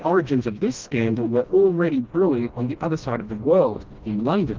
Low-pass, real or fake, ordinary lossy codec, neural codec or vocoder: 7.2 kHz; fake; Opus, 24 kbps; codec, 16 kHz, 1 kbps, FreqCodec, smaller model